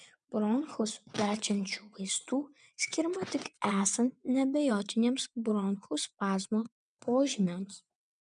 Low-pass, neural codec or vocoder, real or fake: 9.9 kHz; vocoder, 22.05 kHz, 80 mel bands, WaveNeXt; fake